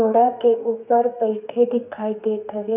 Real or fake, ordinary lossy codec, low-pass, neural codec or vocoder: fake; none; 3.6 kHz; codec, 32 kHz, 1.9 kbps, SNAC